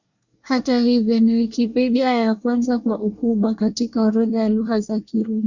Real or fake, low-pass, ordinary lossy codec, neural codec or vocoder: fake; 7.2 kHz; Opus, 64 kbps; codec, 24 kHz, 1 kbps, SNAC